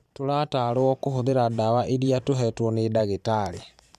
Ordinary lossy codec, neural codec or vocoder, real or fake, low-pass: none; vocoder, 44.1 kHz, 128 mel bands every 512 samples, BigVGAN v2; fake; 14.4 kHz